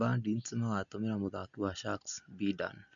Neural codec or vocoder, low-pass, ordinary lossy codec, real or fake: none; 7.2 kHz; MP3, 96 kbps; real